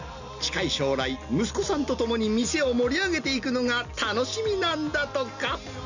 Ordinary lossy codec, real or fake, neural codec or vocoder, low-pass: none; real; none; 7.2 kHz